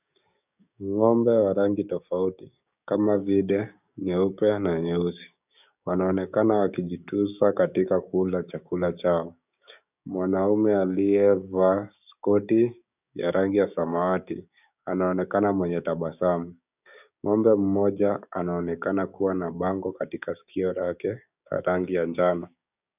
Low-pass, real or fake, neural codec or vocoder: 3.6 kHz; fake; codec, 16 kHz, 6 kbps, DAC